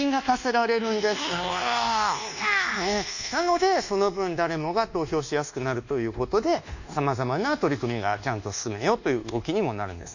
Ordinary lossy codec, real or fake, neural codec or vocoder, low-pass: none; fake; codec, 24 kHz, 1.2 kbps, DualCodec; 7.2 kHz